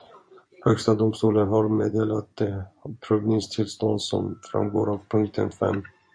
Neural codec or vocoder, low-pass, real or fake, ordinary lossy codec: vocoder, 24 kHz, 100 mel bands, Vocos; 9.9 kHz; fake; MP3, 48 kbps